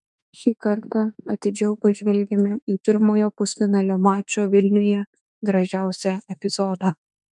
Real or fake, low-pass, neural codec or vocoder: fake; 10.8 kHz; autoencoder, 48 kHz, 32 numbers a frame, DAC-VAE, trained on Japanese speech